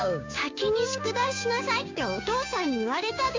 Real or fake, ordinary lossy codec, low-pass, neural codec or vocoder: fake; MP3, 64 kbps; 7.2 kHz; codec, 16 kHz, 6 kbps, DAC